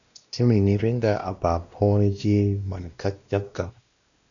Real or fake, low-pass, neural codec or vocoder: fake; 7.2 kHz; codec, 16 kHz, 1 kbps, X-Codec, WavLM features, trained on Multilingual LibriSpeech